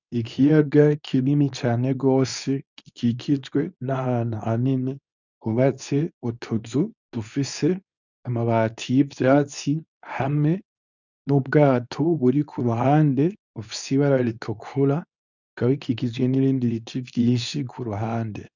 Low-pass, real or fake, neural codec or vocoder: 7.2 kHz; fake; codec, 24 kHz, 0.9 kbps, WavTokenizer, medium speech release version 2